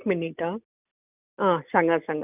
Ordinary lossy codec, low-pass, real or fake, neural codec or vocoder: none; 3.6 kHz; real; none